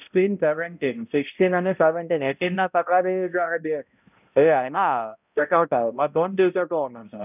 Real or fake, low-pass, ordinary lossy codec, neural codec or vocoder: fake; 3.6 kHz; none; codec, 16 kHz, 0.5 kbps, X-Codec, HuBERT features, trained on balanced general audio